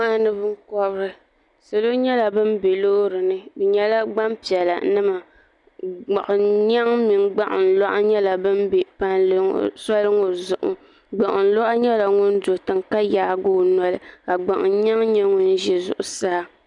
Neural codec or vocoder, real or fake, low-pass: none; real; 10.8 kHz